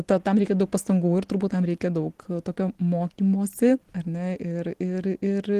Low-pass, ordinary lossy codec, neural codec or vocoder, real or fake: 14.4 kHz; Opus, 16 kbps; none; real